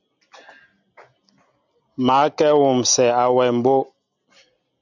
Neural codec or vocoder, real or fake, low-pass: none; real; 7.2 kHz